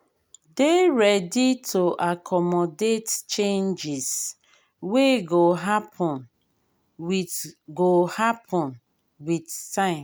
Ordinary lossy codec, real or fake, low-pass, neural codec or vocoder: none; real; none; none